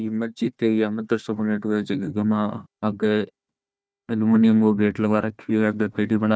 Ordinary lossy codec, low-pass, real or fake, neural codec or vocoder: none; none; fake; codec, 16 kHz, 1 kbps, FunCodec, trained on Chinese and English, 50 frames a second